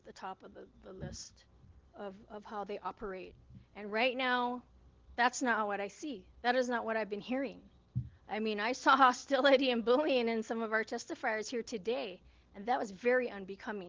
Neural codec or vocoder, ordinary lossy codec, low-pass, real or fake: none; Opus, 16 kbps; 7.2 kHz; real